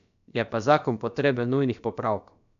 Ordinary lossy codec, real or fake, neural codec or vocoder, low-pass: none; fake; codec, 16 kHz, about 1 kbps, DyCAST, with the encoder's durations; 7.2 kHz